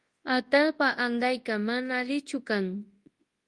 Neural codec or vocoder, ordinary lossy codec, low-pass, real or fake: codec, 24 kHz, 0.9 kbps, WavTokenizer, large speech release; Opus, 24 kbps; 10.8 kHz; fake